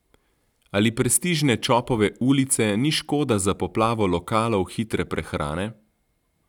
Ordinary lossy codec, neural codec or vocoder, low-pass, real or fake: none; none; 19.8 kHz; real